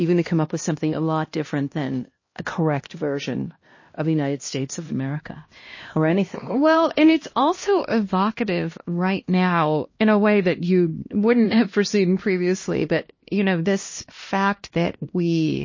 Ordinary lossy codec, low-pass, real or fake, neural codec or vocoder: MP3, 32 kbps; 7.2 kHz; fake; codec, 16 kHz, 1 kbps, X-Codec, HuBERT features, trained on LibriSpeech